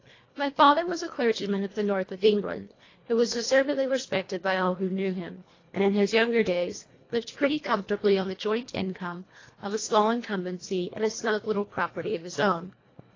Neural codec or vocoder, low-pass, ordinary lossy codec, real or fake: codec, 24 kHz, 1.5 kbps, HILCodec; 7.2 kHz; AAC, 32 kbps; fake